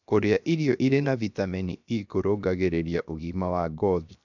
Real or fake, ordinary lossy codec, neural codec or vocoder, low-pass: fake; none; codec, 16 kHz, 0.7 kbps, FocalCodec; 7.2 kHz